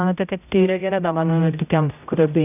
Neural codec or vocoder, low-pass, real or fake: codec, 16 kHz, 0.5 kbps, X-Codec, HuBERT features, trained on general audio; 3.6 kHz; fake